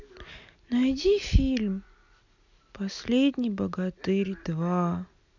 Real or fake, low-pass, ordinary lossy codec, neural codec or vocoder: real; 7.2 kHz; none; none